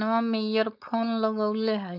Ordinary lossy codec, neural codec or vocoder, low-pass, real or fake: none; codec, 16 kHz, 4.8 kbps, FACodec; 5.4 kHz; fake